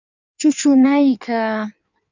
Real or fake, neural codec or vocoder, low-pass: fake; codec, 16 kHz in and 24 kHz out, 1.1 kbps, FireRedTTS-2 codec; 7.2 kHz